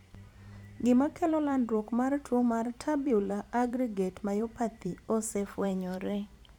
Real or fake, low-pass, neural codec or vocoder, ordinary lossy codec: fake; 19.8 kHz; vocoder, 44.1 kHz, 128 mel bands every 512 samples, BigVGAN v2; none